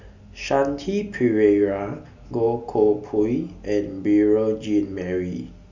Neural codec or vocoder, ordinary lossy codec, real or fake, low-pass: none; none; real; 7.2 kHz